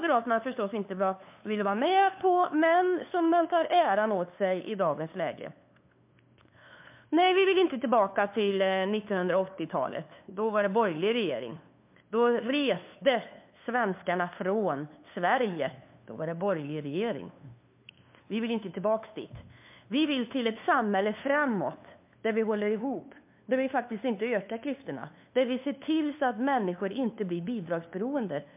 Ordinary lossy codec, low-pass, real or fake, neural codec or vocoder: MP3, 32 kbps; 3.6 kHz; fake; codec, 16 kHz, 2 kbps, FunCodec, trained on LibriTTS, 25 frames a second